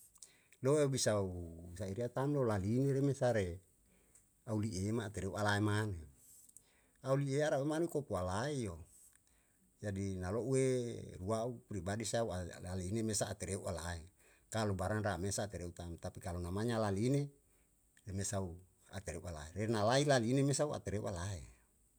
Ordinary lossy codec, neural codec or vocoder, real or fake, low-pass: none; none; real; none